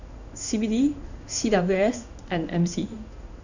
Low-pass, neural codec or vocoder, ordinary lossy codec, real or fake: 7.2 kHz; codec, 16 kHz in and 24 kHz out, 1 kbps, XY-Tokenizer; none; fake